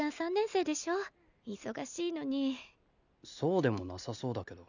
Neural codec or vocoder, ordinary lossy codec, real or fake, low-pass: none; none; real; 7.2 kHz